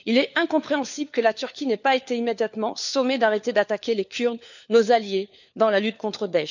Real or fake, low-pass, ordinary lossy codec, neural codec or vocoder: fake; 7.2 kHz; none; codec, 16 kHz, 4 kbps, FunCodec, trained on LibriTTS, 50 frames a second